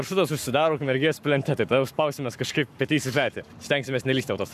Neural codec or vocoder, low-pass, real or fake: codec, 44.1 kHz, 7.8 kbps, Pupu-Codec; 14.4 kHz; fake